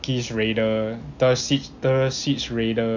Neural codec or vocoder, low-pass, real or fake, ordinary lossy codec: none; 7.2 kHz; real; none